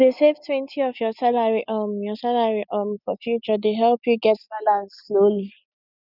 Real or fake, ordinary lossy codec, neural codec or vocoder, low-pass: real; none; none; 5.4 kHz